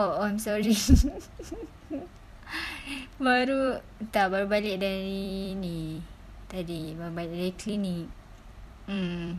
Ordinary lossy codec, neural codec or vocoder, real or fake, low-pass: none; vocoder, 44.1 kHz, 128 mel bands every 256 samples, BigVGAN v2; fake; 14.4 kHz